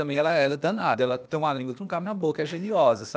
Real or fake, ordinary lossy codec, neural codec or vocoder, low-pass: fake; none; codec, 16 kHz, 0.8 kbps, ZipCodec; none